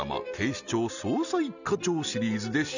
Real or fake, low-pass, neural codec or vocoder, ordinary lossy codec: real; 7.2 kHz; none; none